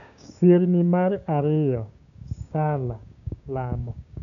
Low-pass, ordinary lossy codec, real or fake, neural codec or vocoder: 7.2 kHz; none; fake; codec, 16 kHz, 6 kbps, DAC